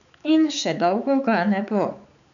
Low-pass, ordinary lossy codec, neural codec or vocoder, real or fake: 7.2 kHz; none; codec, 16 kHz, 4 kbps, X-Codec, HuBERT features, trained on balanced general audio; fake